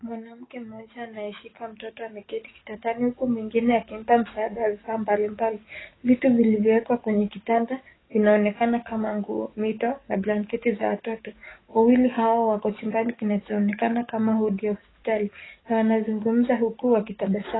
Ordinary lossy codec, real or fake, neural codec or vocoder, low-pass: AAC, 16 kbps; fake; codec, 44.1 kHz, 7.8 kbps, DAC; 7.2 kHz